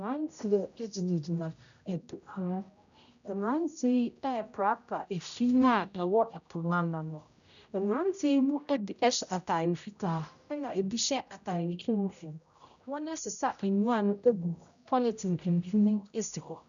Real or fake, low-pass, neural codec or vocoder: fake; 7.2 kHz; codec, 16 kHz, 0.5 kbps, X-Codec, HuBERT features, trained on general audio